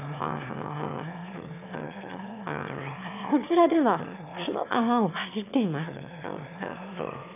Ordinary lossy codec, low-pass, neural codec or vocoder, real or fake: none; 3.6 kHz; autoencoder, 22.05 kHz, a latent of 192 numbers a frame, VITS, trained on one speaker; fake